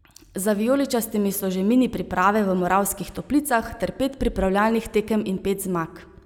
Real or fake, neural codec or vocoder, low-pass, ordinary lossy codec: real; none; 19.8 kHz; none